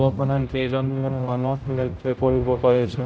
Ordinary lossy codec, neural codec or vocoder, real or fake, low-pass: none; codec, 16 kHz, 0.5 kbps, X-Codec, HuBERT features, trained on general audio; fake; none